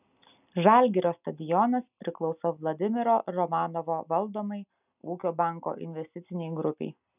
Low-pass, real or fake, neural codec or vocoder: 3.6 kHz; real; none